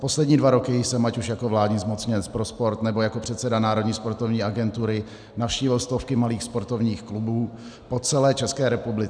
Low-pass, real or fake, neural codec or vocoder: 9.9 kHz; real; none